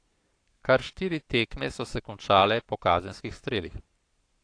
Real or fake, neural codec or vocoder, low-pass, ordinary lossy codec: fake; codec, 44.1 kHz, 7.8 kbps, Pupu-Codec; 9.9 kHz; AAC, 48 kbps